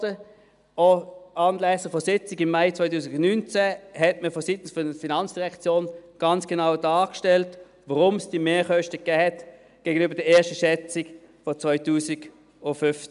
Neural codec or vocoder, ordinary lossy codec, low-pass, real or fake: none; none; 10.8 kHz; real